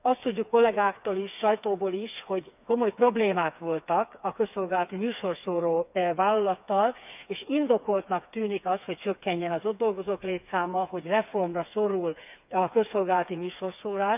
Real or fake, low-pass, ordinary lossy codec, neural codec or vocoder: fake; 3.6 kHz; none; codec, 16 kHz, 4 kbps, FreqCodec, smaller model